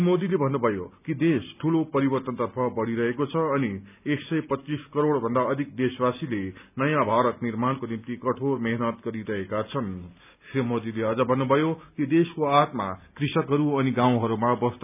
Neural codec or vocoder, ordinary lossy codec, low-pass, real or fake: none; none; 3.6 kHz; real